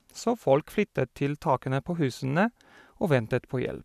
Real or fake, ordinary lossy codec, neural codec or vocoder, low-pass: real; none; none; 14.4 kHz